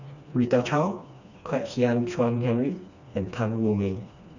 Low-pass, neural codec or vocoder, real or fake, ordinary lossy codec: 7.2 kHz; codec, 16 kHz, 2 kbps, FreqCodec, smaller model; fake; none